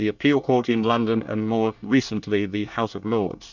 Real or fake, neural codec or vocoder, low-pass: fake; codec, 24 kHz, 1 kbps, SNAC; 7.2 kHz